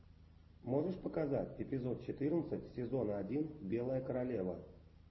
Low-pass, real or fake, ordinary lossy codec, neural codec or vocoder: 7.2 kHz; real; MP3, 24 kbps; none